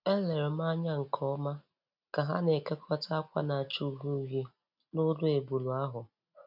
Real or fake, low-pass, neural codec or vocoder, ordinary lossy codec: real; 5.4 kHz; none; none